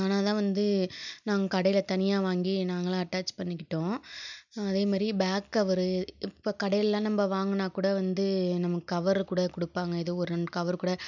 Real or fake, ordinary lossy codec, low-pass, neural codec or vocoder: real; none; 7.2 kHz; none